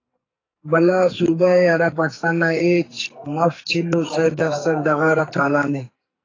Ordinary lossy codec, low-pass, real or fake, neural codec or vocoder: AAC, 32 kbps; 7.2 kHz; fake; codec, 44.1 kHz, 2.6 kbps, SNAC